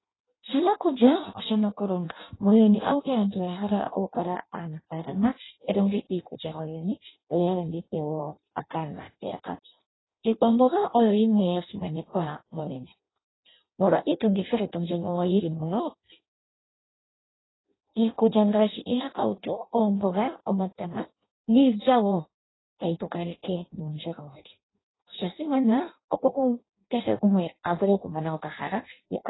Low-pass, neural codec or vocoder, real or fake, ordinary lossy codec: 7.2 kHz; codec, 16 kHz in and 24 kHz out, 0.6 kbps, FireRedTTS-2 codec; fake; AAC, 16 kbps